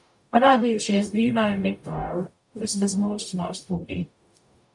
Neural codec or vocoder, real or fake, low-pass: codec, 44.1 kHz, 0.9 kbps, DAC; fake; 10.8 kHz